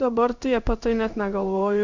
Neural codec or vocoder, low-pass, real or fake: codec, 16 kHz in and 24 kHz out, 1 kbps, XY-Tokenizer; 7.2 kHz; fake